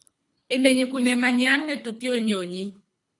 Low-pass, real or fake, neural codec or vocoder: 10.8 kHz; fake; codec, 24 kHz, 3 kbps, HILCodec